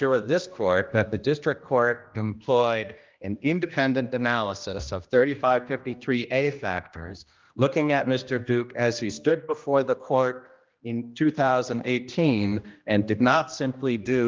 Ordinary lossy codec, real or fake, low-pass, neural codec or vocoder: Opus, 24 kbps; fake; 7.2 kHz; codec, 16 kHz, 1 kbps, X-Codec, HuBERT features, trained on general audio